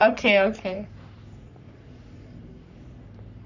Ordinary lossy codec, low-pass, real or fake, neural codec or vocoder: none; 7.2 kHz; fake; codec, 44.1 kHz, 3.4 kbps, Pupu-Codec